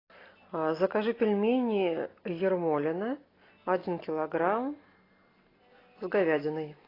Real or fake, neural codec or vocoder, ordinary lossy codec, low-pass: real; none; AAC, 24 kbps; 5.4 kHz